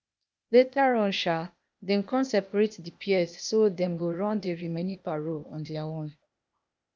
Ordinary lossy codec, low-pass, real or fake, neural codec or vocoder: none; none; fake; codec, 16 kHz, 0.8 kbps, ZipCodec